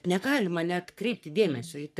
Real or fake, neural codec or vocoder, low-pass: fake; codec, 44.1 kHz, 3.4 kbps, Pupu-Codec; 14.4 kHz